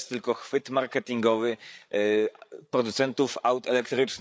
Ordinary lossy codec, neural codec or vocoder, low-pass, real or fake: none; codec, 16 kHz, 8 kbps, FreqCodec, larger model; none; fake